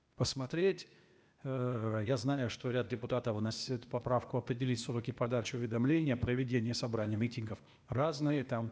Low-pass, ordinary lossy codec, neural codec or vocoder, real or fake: none; none; codec, 16 kHz, 0.8 kbps, ZipCodec; fake